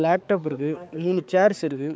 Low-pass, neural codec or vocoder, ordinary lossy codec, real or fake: none; codec, 16 kHz, 4 kbps, X-Codec, HuBERT features, trained on balanced general audio; none; fake